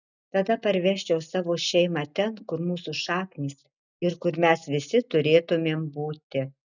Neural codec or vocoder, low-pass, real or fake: none; 7.2 kHz; real